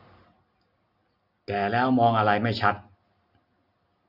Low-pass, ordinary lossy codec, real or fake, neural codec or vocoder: 5.4 kHz; Opus, 64 kbps; real; none